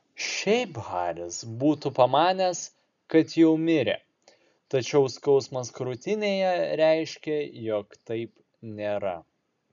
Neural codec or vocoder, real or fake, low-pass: none; real; 7.2 kHz